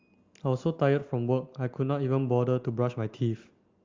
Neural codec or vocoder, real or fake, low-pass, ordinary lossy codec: none; real; 7.2 kHz; Opus, 32 kbps